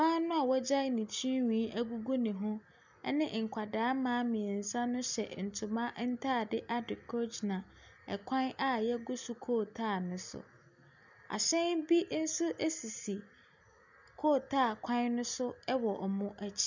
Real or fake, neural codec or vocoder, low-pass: real; none; 7.2 kHz